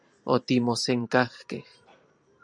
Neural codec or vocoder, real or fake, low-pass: vocoder, 44.1 kHz, 128 mel bands every 512 samples, BigVGAN v2; fake; 9.9 kHz